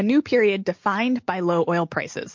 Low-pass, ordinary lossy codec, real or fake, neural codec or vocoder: 7.2 kHz; MP3, 48 kbps; real; none